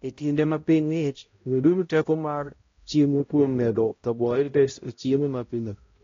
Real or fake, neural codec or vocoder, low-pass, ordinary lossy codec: fake; codec, 16 kHz, 0.5 kbps, X-Codec, HuBERT features, trained on balanced general audio; 7.2 kHz; AAC, 32 kbps